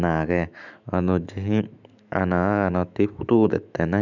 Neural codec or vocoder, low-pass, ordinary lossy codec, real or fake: autoencoder, 48 kHz, 128 numbers a frame, DAC-VAE, trained on Japanese speech; 7.2 kHz; none; fake